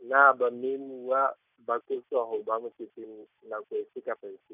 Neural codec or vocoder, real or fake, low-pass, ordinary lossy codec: none; real; 3.6 kHz; none